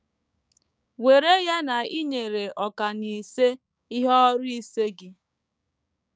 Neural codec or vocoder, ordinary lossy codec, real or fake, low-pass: codec, 16 kHz, 6 kbps, DAC; none; fake; none